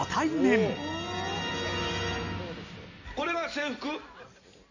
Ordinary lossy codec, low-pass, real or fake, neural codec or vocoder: none; 7.2 kHz; real; none